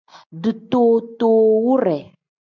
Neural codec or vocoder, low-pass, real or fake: none; 7.2 kHz; real